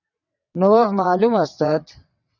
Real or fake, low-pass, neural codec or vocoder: fake; 7.2 kHz; vocoder, 22.05 kHz, 80 mel bands, WaveNeXt